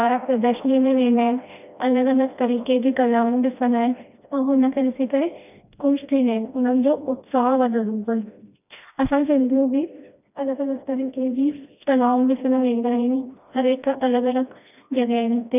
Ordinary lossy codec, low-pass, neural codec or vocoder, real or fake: none; 3.6 kHz; codec, 16 kHz, 1 kbps, FreqCodec, smaller model; fake